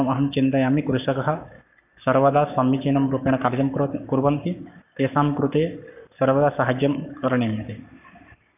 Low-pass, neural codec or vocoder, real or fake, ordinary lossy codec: 3.6 kHz; codec, 44.1 kHz, 7.8 kbps, Pupu-Codec; fake; none